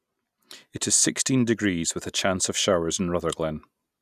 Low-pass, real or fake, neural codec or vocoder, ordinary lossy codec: 14.4 kHz; real; none; none